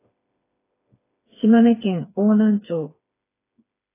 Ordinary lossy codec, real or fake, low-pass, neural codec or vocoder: AAC, 24 kbps; fake; 3.6 kHz; codec, 16 kHz, 4 kbps, FreqCodec, smaller model